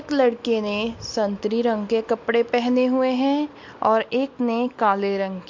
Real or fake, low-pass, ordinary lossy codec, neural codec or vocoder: real; 7.2 kHz; MP3, 48 kbps; none